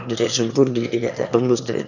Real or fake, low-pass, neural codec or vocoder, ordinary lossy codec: fake; 7.2 kHz; autoencoder, 22.05 kHz, a latent of 192 numbers a frame, VITS, trained on one speaker; none